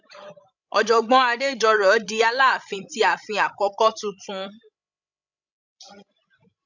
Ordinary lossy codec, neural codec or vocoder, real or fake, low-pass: none; codec, 16 kHz, 16 kbps, FreqCodec, larger model; fake; 7.2 kHz